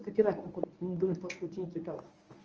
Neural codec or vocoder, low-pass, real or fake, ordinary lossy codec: codec, 24 kHz, 0.9 kbps, WavTokenizer, medium speech release version 1; 7.2 kHz; fake; Opus, 24 kbps